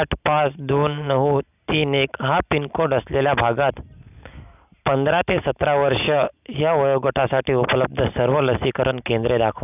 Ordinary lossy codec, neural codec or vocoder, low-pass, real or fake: none; none; 3.6 kHz; real